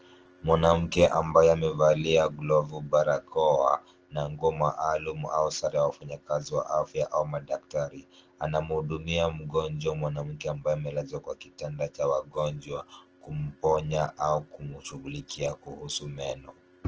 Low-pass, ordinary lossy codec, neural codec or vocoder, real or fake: 7.2 kHz; Opus, 16 kbps; none; real